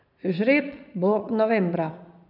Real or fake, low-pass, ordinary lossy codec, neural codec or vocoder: fake; 5.4 kHz; none; vocoder, 22.05 kHz, 80 mel bands, Vocos